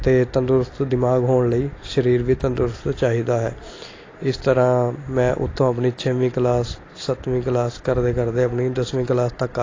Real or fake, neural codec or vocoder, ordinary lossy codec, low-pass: real; none; AAC, 32 kbps; 7.2 kHz